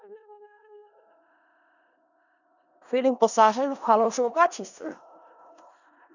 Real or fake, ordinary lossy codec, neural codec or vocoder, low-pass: fake; none; codec, 16 kHz in and 24 kHz out, 0.4 kbps, LongCat-Audio-Codec, four codebook decoder; 7.2 kHz